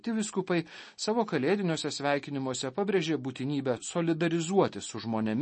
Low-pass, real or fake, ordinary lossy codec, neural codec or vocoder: 10.8 kHz; real; MP3, 32 kbps; none